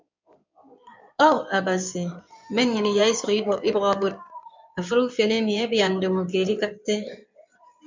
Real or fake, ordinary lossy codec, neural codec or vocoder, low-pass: fake; MP3, 64 kbps; codec, 16 kHz in and 24 kHz out, 2.2 kbps, FireRedTTS-2 codec; 7.2 kHz